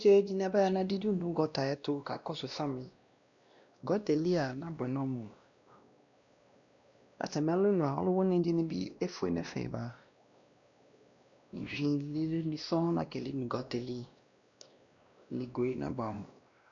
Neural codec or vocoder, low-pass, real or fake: codec, 16 kHz, 1 kbps, X-Codec, WavLM features, trained on Multilingual LibriSpeech; 7.2 kHz; fake